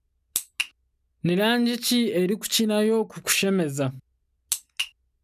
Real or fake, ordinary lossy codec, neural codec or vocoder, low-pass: real; none; none; 14.4 kHz